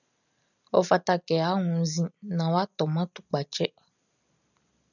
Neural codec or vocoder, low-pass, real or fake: none; 7.2 kHz; real